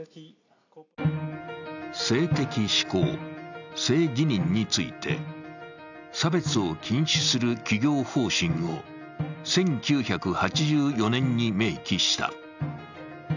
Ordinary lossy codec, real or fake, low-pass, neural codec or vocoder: none; real; 7.2 kHz; none